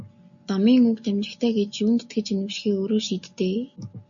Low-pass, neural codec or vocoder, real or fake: 7.2 kHz; none; real